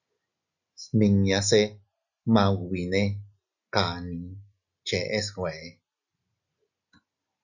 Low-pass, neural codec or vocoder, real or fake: 7.2 kHz; none; real